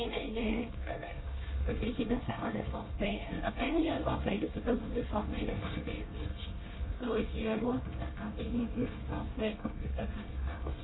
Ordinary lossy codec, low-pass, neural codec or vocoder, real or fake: AAC, 16 kbps; 7.2 kHz; codec, 24 kHz, 1 kbps, SNAC; fake